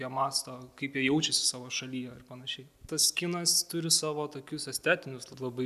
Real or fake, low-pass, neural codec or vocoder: real; 14.4 kHz; none